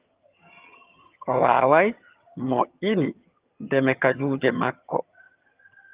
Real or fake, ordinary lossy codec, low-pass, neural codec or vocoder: fake; Opus, 24 kbps; 3.6 kHz; vocoder, 22.05 kHz, 80 mel bands, HiFi-GAN